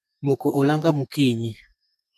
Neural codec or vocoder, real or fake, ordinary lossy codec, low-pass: codec, 32 kHz, 1.9 kbps, SNAC; fake; none; 14.4 kHz